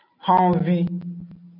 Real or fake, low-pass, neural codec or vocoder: real; 5.4 kHz; none